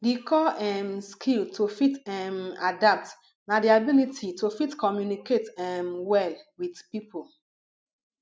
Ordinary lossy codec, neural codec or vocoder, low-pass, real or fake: none; none; none; real